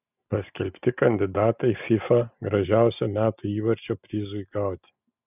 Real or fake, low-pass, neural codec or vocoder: real; 3.6 kHz; none